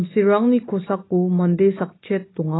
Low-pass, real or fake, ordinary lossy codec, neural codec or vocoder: 7.2 kHz; real; AAC, 16 kbps; none